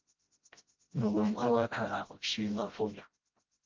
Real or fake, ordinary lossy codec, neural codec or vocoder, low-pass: fake; Opus, 24 kbps; codec, 16 kHz, 0.5 kbps, FreqCodec, smaller model; 7.2 kHz